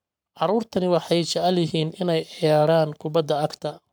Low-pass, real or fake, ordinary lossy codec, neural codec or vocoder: none; fake; none; codec, 44.1 kHz, 7.8 kbps, Pupu-Codec